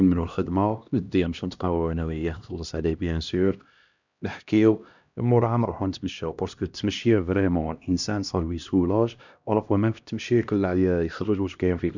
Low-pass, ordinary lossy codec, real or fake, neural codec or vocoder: 7.2 kHz; none; fake; codec, 16 kHz, 1 kbps, X-Codec, HuBERT features, trained on LibriSpeech